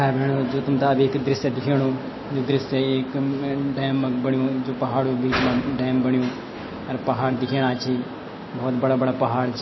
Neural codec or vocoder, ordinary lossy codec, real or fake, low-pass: none; MP3, 24 kbps; real; 7.2 kHz